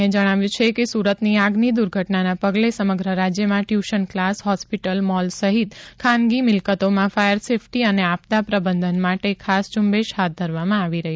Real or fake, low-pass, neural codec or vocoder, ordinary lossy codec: real; none; none; none